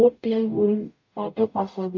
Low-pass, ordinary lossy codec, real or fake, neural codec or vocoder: 7.2 kHz; MP3, 64 kbps; fake; codec, 44.1 kHz, 0.9 kbps, DAC